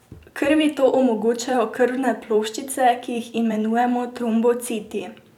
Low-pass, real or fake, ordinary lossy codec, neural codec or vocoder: 19.8 kHz; fake; none; vocoder, 44.1 kHz, 128 mel bands every 256 samples, BigVGAN v2